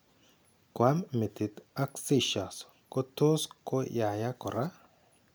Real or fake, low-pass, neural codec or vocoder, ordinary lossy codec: real; none; none; none